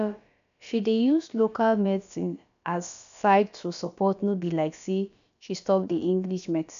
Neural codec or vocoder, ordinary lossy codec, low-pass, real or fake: codec, 16 kHz, about 1 kbps, DyCAST, with the encoder's durations; MP3, 96 kbps; 7.2 kHz; fake